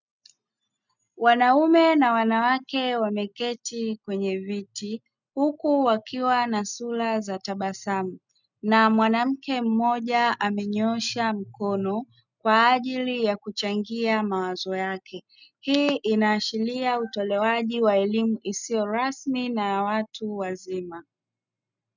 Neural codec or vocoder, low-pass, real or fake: none; 7.2 kHz; real